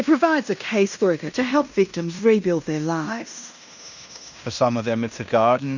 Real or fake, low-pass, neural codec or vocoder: fake; 7.2 kHz; codec, 16 kHz in and 24 kHz out, 0.9 kbps, LongCat-Audio-Codec, fine tuned four codebook decoder